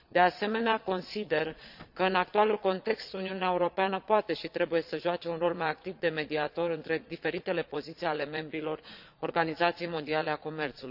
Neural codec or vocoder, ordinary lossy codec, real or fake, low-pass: vocoder, 22.05 kHz, 80 mel bands, Vocos; none; fake; 5.4 kHz